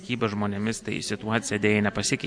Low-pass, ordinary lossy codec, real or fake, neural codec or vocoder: 9.9 kHz; MP3, 96 kbps; real; none